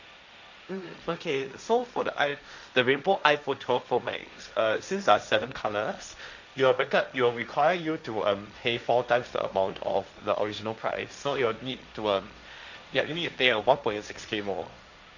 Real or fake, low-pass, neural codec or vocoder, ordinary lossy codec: fake; 7.2 kHz; codec, 16 kHz, 1.1 kbps, Voila-Tokenizer; none